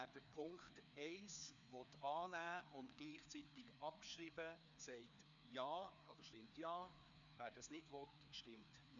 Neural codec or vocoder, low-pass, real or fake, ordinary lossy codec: codec, 16 kHz, 2 kbps, FreqCodec, larger model; 7.2 kHz; fake; none